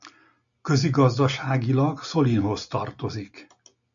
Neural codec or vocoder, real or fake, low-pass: none; real; 7.2 kHz